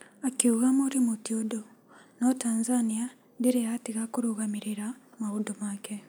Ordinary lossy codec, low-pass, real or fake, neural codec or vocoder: none; none; real; none